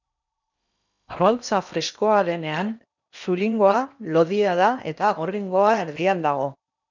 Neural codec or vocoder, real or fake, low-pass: codec, 16 kHz in and 24 kHz out, 0.8 kbps, FocalCodec, streaming, 65536 codes; fake; 7.2 kHz